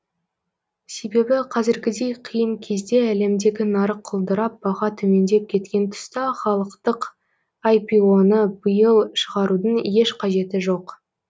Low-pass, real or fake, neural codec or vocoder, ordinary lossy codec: none; real; none; none